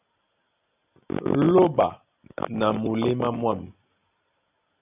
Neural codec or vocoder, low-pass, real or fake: none; 3.6 kHz; real